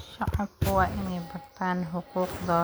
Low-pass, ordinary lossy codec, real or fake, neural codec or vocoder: none; none; real; none